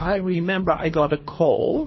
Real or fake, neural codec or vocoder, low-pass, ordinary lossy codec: fake; codec, 24 kHz, 3 kbps, HILCodec; 7.2 kHz; MP3, 24 kbps